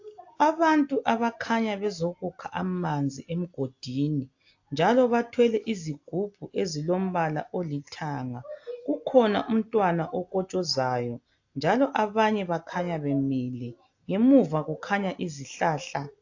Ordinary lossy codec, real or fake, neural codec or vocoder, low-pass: AAC, 48 kbps; real; none; 7.2 kHz